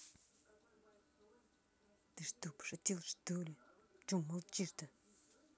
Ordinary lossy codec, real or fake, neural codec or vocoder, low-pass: none; real; none; none